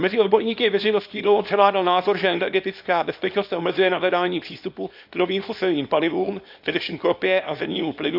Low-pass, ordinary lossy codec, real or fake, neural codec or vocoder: 5.4 kHz; none; fake; codec, 24 kHz, 0.9 kbps, WavTokenizer, small release